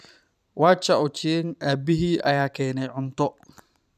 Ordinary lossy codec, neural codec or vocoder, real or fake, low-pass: none; vocoder, 44.1 kHz, 128 mel bands every 512 samples, BigVGAN v2; fake; 14.4 kHz